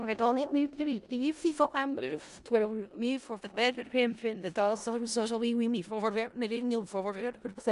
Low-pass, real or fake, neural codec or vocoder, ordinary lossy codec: 10.8 kHz; fake; codec, 16 kHz in and 24 kHz out, 0.4 kbps, LongCat-Audio-Codec, four codebook decoder; Opus, 64 kbps